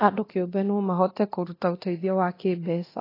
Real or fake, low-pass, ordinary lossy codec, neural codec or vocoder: fake; 5.4 kHz; AAC, 24 kbps; codec, 24 kHz, 0.9 kbps, DualCodec